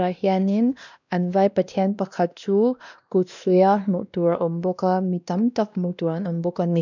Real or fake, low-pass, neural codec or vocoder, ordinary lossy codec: fake; 7.2 kHz; codec, 16 kHz, 1 kbps, X-Codec, WavLM features, trained on Multilingual LibriSpeech; none